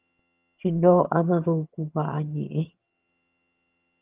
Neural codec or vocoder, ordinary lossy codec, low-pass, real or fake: vocoder, 22.05 kHz, 80 mel bands, HiFi-GAN; Opus, 24 kbps; 3.6 kHz; fake